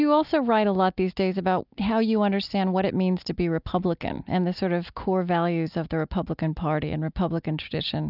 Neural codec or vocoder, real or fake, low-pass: none; real; 5.4 kHz